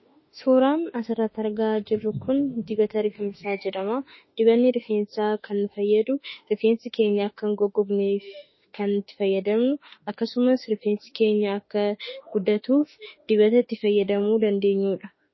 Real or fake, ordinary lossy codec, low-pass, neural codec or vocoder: fake; MP3, 24 kbps; 7.2 kHz; autoencoder, 48 kHz, 32 numbers a frame, DAC-VAE, trained on Japanese speech